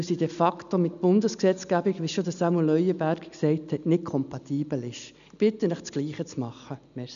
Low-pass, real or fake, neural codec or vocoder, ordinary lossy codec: 7.2 kHz; real; none; none